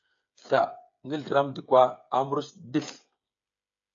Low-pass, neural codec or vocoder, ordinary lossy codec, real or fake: 7.2 kHz; codec, 16 kHz, 8 kbps, FreqCodec, smaller model; AAC, 48 kbps; fake